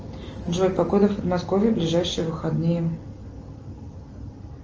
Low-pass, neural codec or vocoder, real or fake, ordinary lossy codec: 7.2 kHz; none; real; Opus, 24 kbps